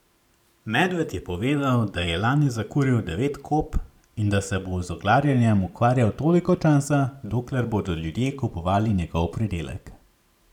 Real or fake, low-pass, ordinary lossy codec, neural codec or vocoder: fake; 19.8 kHz; none; vocoder, 44.1 kHz, 128 mel bands every 512 samples, BigVGAN v2